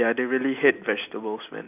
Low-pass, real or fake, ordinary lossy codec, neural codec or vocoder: 3.6 kHz; real; none; none